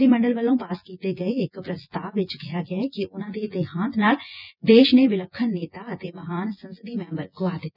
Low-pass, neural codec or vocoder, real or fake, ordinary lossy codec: 5.4 kHz; vocoder, 24 kHz, 100 mel bands, Vocos; fake; none